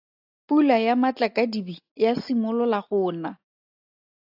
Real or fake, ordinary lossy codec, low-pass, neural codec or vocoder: real; AAC, 48 kbps; 5.4 kHz; none